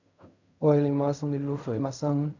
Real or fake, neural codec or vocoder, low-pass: fake; codec, 16 kHz in and 24 kHz out, 0.4 kbps, LongCat-Audio-Codec, fine tuned four codebook decoder; 7.2 kHz